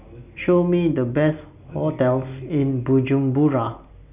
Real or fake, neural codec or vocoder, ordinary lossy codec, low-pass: real; none; none; 3.6 kHz